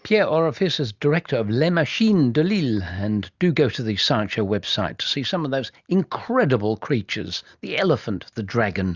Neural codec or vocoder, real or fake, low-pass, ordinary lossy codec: none; real; 7.2 kHz; Opus, 64 kbps